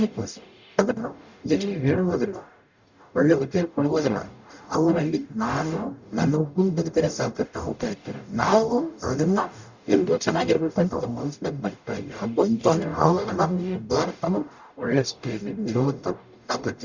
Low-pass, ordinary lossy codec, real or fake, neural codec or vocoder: 7.2 kHz; Opus, 64 kbps; fake; codec, 44.1 kHz, 0.9 kbps, DAC